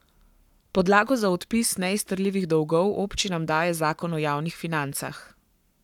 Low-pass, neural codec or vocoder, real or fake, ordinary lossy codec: 19.8 kHz; codec, 44.1 kHz, 7.8 kbps, Pupu-Codec; fake; none